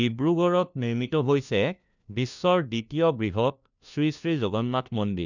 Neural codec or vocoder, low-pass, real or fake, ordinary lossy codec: codec, 16 kHz, 1 kbps, FunCodec, trained on LibriTTS, 50 frames a second; 7.2 kHz; fake; none